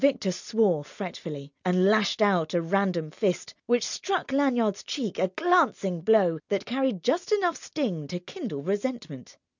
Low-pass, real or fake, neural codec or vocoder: 7.2 kHz; real; none